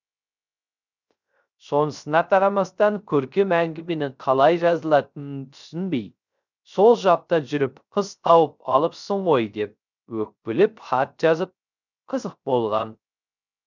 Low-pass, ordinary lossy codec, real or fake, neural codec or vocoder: 7.2 kHz; none; fake; codec, 16 kHz, 0.3 kbps, FocalCodec